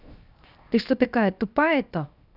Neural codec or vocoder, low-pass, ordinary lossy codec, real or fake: codec, 16 kHz, 0.7 kbps, FocalCodec; 5.4 kHz; none; fake